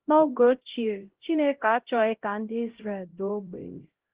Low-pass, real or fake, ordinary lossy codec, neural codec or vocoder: 3.6 kHz; fake; Opus, 16 kbps; codec, 16 kHz, 0.5 kbps, X-Codec, HuBERT features, trained on LibriSpeech